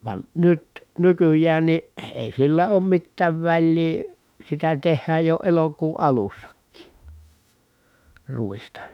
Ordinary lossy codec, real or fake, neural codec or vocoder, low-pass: none; fake; autoencoder, 48 kHz, 32 numbers a frame, DAC-VAE, trained on Japanese speech; 19.8 kHz